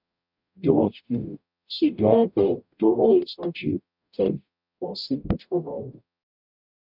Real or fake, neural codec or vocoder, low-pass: fake; codec, 44.1 kHz, 0.9 kbps, DAC; 5.4 kHz